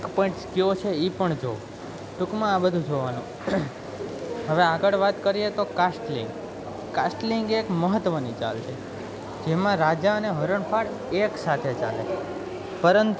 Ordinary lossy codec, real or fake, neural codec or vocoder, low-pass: none; real; none; none